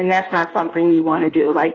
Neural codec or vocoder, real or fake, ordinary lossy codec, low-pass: codec, 16 kHz in and 24 kHz out, 1.1 kbps, FireRedTTS-2 codec; fake; AAC, 32 kbps; 7.2 kHz